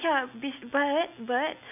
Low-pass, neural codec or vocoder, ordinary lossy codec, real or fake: 3.6 kHz; none; none; real